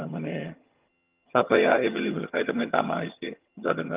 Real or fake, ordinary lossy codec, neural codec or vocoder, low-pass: fake; Opus, 24 kbps; vocoder, 22.05 kHz, 80 mel bands, HiFi-GAN; 3.6 kHz